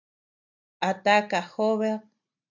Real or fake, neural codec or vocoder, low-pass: real; none; 7.2 kHz